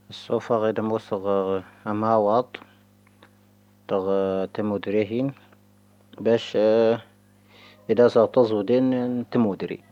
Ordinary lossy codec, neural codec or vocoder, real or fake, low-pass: none; none; real; 19.8 kHz